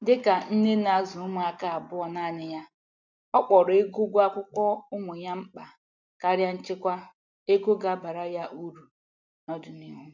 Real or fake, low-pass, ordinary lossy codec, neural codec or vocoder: real; 7.2 kHz; none; none